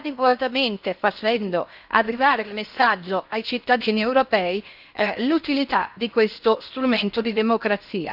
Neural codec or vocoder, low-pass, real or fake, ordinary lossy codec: codec, 16 kHz in and 24 kHz out, 0.6 kbps, FocalCodec, streaming, 4096 codes; 5.4 kHz; fake; none